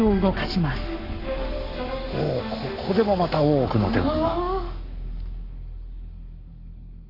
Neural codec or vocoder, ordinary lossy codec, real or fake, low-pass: codec, 44.1 kHz, 7.8 kbps, Pupu-Codec; none; fake; 5.4 kHz